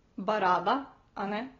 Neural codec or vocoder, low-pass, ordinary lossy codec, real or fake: none; 7.2 kHz; AAC, 24 kbps; real